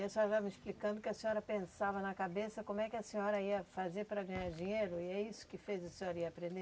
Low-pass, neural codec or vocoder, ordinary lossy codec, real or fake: none; none; none; real